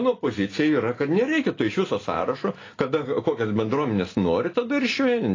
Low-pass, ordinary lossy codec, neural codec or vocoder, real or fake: 7.2 kHz; AAC, 32 kbps; none; real